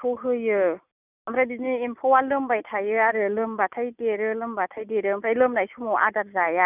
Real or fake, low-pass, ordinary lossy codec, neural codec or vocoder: real; 3.6 kHz; none; none